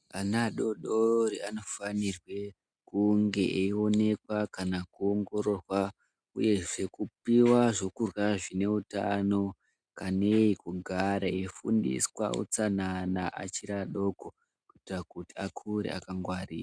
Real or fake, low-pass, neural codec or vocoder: real; 9.9 kHz; none